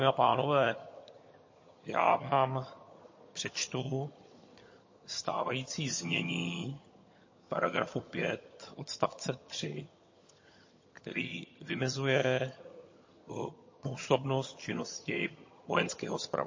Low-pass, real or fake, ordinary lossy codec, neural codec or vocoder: 7.2 kHz; fake; MP3, 32 kbps; vocoder, 22.05 kHz, 80 mel bands, HiFi-GAN